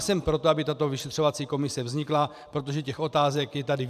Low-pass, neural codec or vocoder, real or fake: 14.4 kHz; vocoder, 44.1 kHz, 128 mel bands every 512 samples, BigVGAN v2; fake